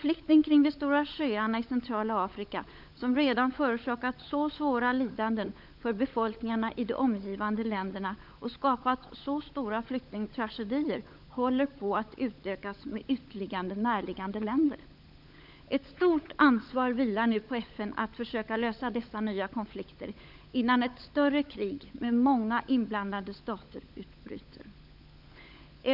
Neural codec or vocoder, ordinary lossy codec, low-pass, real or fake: codec, 16 kHz, 16 kbps, FunCodec, trained on LibriTTS, 50 frames a second; none; 5.4 kHz; fake